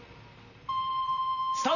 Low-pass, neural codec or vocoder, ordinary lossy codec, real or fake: 7.2 kHz; vocoder, 44.1 kHz, 128 mel bands, Pupu-Vocoder; none; fake